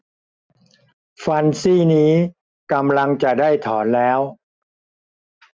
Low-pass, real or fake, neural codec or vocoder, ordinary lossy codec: none; real; none; none